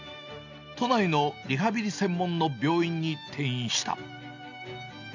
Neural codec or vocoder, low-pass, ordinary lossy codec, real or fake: none; 7.2 kHz; none; real